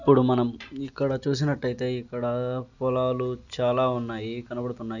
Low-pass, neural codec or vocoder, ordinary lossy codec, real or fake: 7.2 kHz; none; none; real